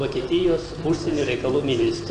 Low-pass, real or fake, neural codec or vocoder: 9.9 kHz; real; none